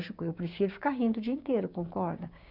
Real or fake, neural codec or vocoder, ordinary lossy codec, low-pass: fake; codec, 16 kHz, 6 kbps, DAC; none; 5.4 kHz